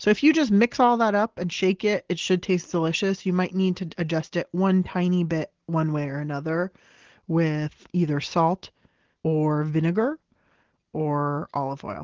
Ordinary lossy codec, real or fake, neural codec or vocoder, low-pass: Opus, 16 kbps; real; none; 7.2 kHz